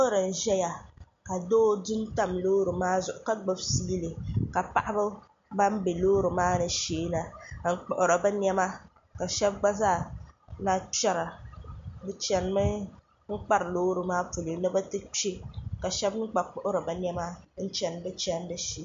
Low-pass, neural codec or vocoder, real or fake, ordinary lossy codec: 7.2 kHz; none; real; MP3, 48 kbps